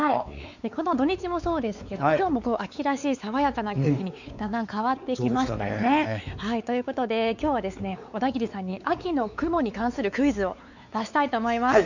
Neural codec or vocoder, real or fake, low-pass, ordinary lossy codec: codec, 16 kHz, 4 kbps, X-Codec, WavLM features, trained on Multilingual LibriSpeech; fake; 7.2 kHz; none